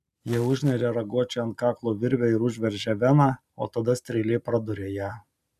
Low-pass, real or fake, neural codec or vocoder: 14.4 kHz; real; none